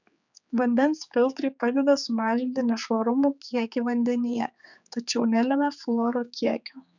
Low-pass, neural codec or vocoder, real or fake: 7.2 kHz; codec, 16 kHz, 4 kbps, X-Codec, HuBERT features, trained on general audio; fake